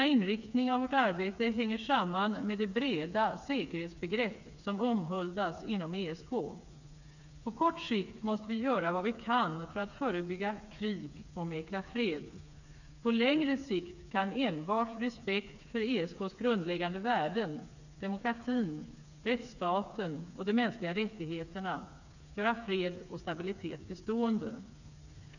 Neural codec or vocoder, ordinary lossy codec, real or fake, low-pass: codec, 16 kHz, 4 kbps, FreqCodec, smaller model; none; fake; 7.2 kHz